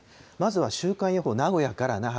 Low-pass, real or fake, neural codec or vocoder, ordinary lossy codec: none; real; none; none